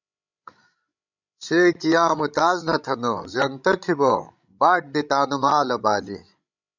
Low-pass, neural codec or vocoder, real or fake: 7.2 kHz; codec, 16 kHz, 16 kbps, FreqCodec, larger model; fake